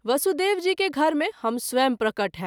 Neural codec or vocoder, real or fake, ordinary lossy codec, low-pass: none; real; none; none